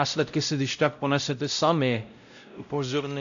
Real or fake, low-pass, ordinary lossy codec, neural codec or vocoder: fake; 7.2 kHz; AAC, 64 kbps; codec, 16 kHz, 0.5 kbps, X-Codec, WavLM features, trained on Multilingual LibriSpeech